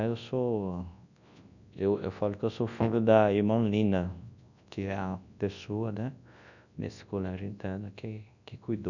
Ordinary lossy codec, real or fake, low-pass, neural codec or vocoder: none; fake; 7.2 kHz; codec, 24 kHz, 0.9 kbps, WavTokenizer, large speech release